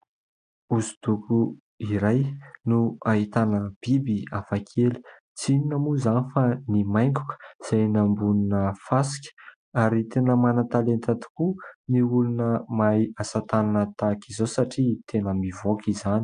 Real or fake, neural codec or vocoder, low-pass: real; none; 9.9 kHz